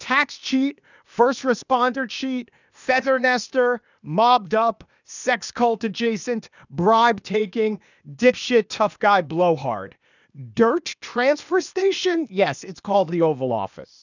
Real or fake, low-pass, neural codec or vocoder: fake; 7.2 kHz; codec, 16 kHz, 0.8 kbps, ZipCodec